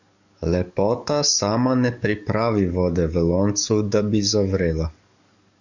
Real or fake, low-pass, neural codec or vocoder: fake; 7.2 kHz; codec, 44.1 kHz, 7.8 kbps, DAC